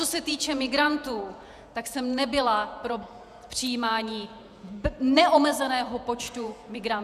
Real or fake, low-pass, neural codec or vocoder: fake; 14.4 kHz; vocoder, 48 kHz, 128 mel bands, Vocos